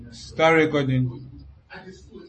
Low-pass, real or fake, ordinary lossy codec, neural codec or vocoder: 10.8 kHz; real; MP3, 32 kbps; none